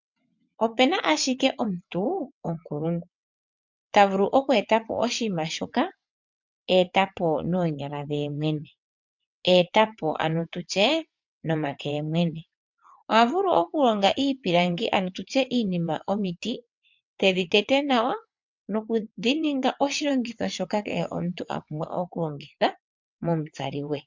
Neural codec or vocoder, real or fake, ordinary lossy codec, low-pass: vocoder, 22.05 kHz, 80 mel bands, WaveNeXt; fake; MP3, 64 kbps; 7.2 kHz